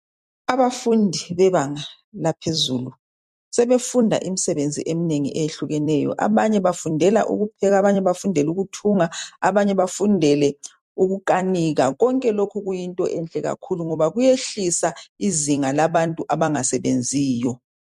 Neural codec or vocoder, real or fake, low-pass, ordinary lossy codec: vocoder, 44.1 kHz, 128 mel bands every 256 samples, BigVGAN v2; fake; 14.4 kHz; MP3, 64 kbps